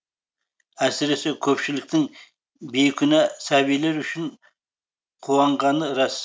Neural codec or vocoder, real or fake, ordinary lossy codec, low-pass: none; real; none; none